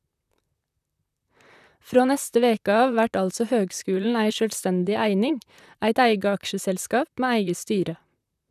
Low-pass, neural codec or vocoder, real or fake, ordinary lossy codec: 14.4 kHz; vocoder, 44.1 kHz, 128 mel bands, Pupu-Vocoder; fake; none